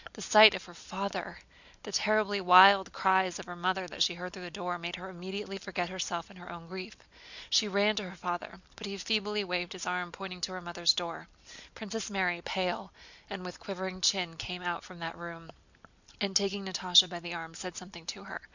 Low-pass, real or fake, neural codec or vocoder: 7.2 kHz; real; none